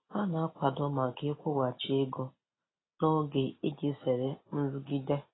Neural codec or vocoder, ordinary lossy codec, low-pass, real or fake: none; AAC, 16 kbps; 7.2 kHz; real